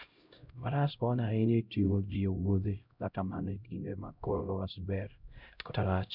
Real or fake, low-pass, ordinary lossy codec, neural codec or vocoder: fake; 5.4 kHz; none; codec, 16 kHz, 0.5 kbps, X-Codec, HuBERT features, trained on LibriSpeech